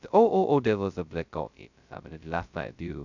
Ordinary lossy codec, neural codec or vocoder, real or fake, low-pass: none; codec, 16 kHz, 0.2 kbps, FocalCodec; fake; 7.2 kHz